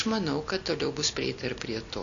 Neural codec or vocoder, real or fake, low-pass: none; real; 7.2 kHz